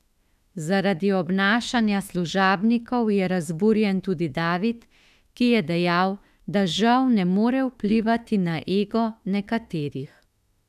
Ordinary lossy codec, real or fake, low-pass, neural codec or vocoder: none; fake; 14.4 kHz; autoencoder, 48 kHz, 32 numbers a frame, DAC-VAE, trained on Japanese speech